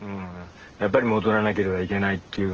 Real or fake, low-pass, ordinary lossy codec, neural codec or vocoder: real; 7.2 kHz; Opus, 16 kbps; none